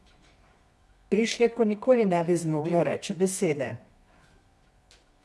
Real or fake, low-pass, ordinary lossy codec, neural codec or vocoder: fake; none; none; codec, 24 kHz, 0.9 kbps, WavTokenizer, medium music audio release